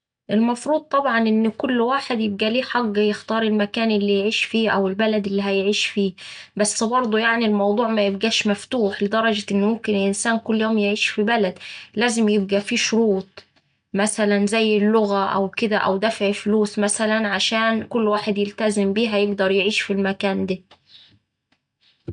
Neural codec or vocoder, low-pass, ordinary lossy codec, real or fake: none; 9.9 kHz; none; real